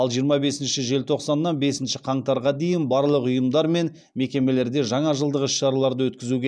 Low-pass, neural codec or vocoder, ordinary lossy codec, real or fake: none; none; none; real